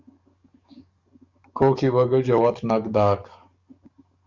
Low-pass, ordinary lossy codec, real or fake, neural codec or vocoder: 7.2 kHz; Opus, 64 kbps; fake; codec, 44.1 kHz, 7.8 kbps, DAC